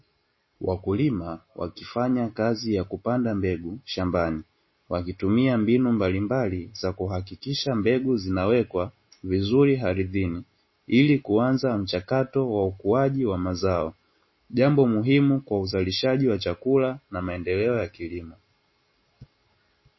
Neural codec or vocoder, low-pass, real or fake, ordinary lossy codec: none; 7.2 kHz; real; MP3, 24 kbps